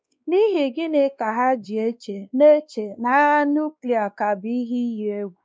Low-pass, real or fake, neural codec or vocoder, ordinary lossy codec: none; fake; codec, 16 kHz, 2 kbps, X-Codec, WavLM features, trained on Multilingual LibriSpeech; none